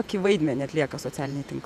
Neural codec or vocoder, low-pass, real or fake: vocoder, 48 kHz, 128 mel bands, Vocos; 14.4 kHz; fake